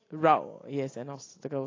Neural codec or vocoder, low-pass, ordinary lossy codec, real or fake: none; 7.2 kHz; AAC, 32 kbps; real